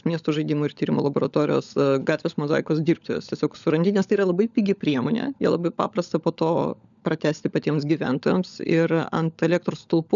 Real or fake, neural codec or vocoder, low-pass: fake; codec, 16 kHz, 16 kbps, FunCodec, trained on LibriTTS, 50 frames a second; 7.2 kHz